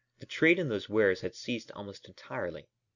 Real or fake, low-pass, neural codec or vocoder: real; 7.2 kHz; none